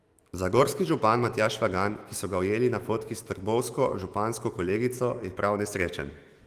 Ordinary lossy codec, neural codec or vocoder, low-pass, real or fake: Opus, 24 kbps; autoencoder, 48 kHz, 128 numbers a frame, DAC-VAE, trained on Japanese speech; 14.4 kHz; fake